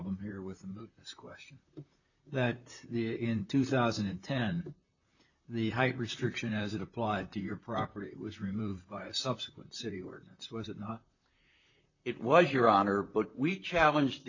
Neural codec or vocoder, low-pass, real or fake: vocoder, 44.1 kHz, 128 mel bands, Pupu-Vocoder; 7.2 kHz; fake